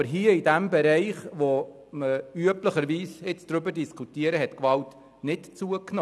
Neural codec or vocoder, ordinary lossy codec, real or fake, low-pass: none; none; real; none